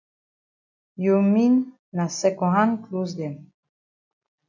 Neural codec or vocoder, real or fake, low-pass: none; real; 7.2 kHz